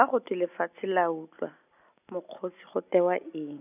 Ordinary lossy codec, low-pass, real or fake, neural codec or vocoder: none; 3.6 kHz; real; none